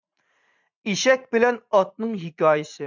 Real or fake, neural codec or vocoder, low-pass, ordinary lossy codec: fake; vocoder, 44.1 kHz, 80 mel bands, Vocos; 7.2 kHz; MP3, 64 kbps